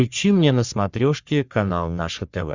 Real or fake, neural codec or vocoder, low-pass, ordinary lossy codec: fake; codec, 44.1 kHz, 3.4 kbps, Pupu-Codec; 7.2 kHz; Opus, 64 kbps